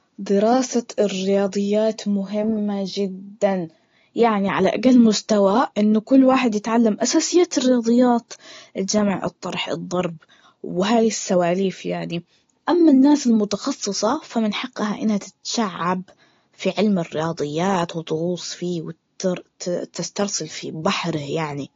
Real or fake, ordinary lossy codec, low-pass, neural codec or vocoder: real; AAC, 32 kbps; 7.2 kHz; none